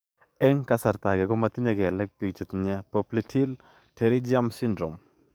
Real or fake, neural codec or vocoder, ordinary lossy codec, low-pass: fake; codec, 44.1 kHz, 7.8 kbps, DAC; none; none